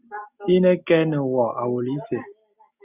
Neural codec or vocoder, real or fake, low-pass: none; real; 3.6 kHz